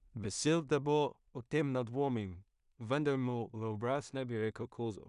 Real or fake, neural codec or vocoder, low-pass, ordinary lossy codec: fake; codec, 16 kHz in and 24 kHz out, 0.4 kbps, LongCat-Audio-Codec, two codebook decoder; 10.8 kHz; none